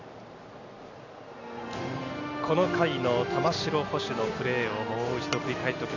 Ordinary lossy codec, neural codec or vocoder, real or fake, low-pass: none; none; real; 7.2 kHz